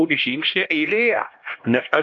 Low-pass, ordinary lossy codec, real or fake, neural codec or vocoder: 7.2 kHz; AAC, 48 kbps; fake; codec, 16 kHz, 1 kbps, X-Codec, HuBERT features, trained on LibriSpeech